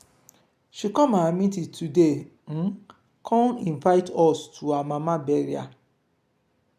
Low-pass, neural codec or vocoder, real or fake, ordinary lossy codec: 14.4 kHz; none; real; none